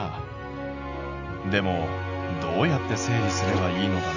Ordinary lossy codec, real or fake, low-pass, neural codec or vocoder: none; real; 7.2 kHz; none